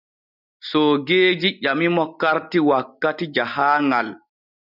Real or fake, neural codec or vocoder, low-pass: real; none; 5.4 kHz